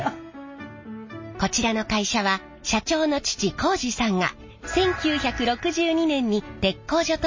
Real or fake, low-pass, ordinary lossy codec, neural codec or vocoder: real; 7.2 kHz; MP3, 32 kbps; none